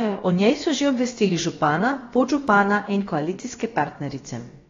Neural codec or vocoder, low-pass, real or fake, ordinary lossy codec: codec, 16 kHz, about 1 kbps, DyCAST, with the encoder's durations; 7.2 kHz; fake; AAC, 32 kbps